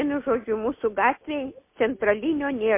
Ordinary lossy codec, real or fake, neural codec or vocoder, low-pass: MP3, 24 kbps; real; none; 3.6 kHz